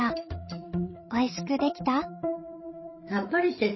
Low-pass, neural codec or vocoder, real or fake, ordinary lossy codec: 7.2 kHz; codec, 16 kHz, 8 kbps, FreqCodec, larger model; fake; MP3, 24 kbps